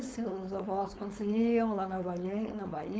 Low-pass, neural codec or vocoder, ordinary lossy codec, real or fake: none; codec, 16 kHz, 4.8 kbps, FACodec; none; fake